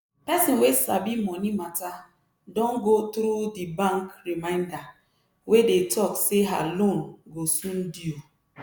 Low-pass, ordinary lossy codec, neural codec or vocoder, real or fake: none; none; none; real